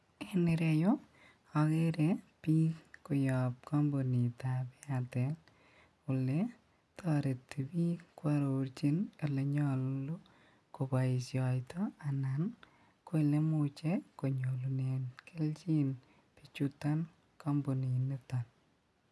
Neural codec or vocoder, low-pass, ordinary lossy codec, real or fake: none; none; none; real